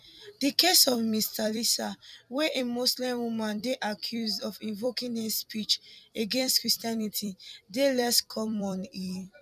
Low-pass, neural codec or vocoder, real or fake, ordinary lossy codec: 14.4 kHz; vocoder, 44.1 kHz, 128 mel bands every 256 samples, BigVGAN v2; fake; none